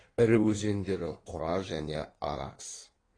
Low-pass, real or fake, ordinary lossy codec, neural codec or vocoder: 9.9 kHz; fake; AAC, 32 kbps; codec, 16 kHz in and 24 kHz out, 1.1 kbps, FireRedTTS-2 codec